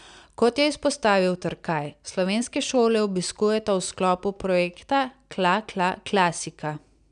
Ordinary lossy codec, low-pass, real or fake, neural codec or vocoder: none; 9.9 kHz; real; none